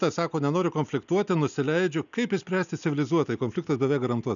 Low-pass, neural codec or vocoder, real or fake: 7.2 kHz; none; real